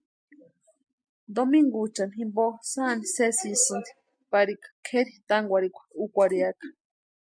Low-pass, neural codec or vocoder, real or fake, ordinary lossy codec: 9.9 kHz; none; real; MP3, 64 kbps